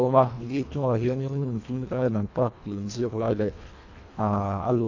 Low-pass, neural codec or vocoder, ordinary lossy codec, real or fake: 7.2 kHz; codec, 24 kHz, 1.5 kbps, HILCodec; AAC, 48 kbps; fake